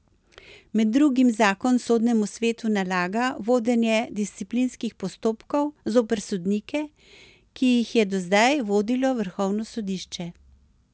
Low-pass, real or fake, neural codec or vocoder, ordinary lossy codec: none; real; none; none